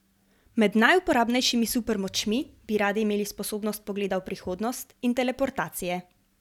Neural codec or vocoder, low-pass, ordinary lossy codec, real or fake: none; 19.8 kHz; none; real